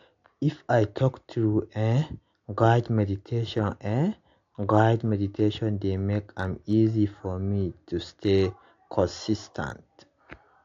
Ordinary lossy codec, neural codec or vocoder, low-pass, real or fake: AAC, 48 kbps; none; 7.2 kHz; real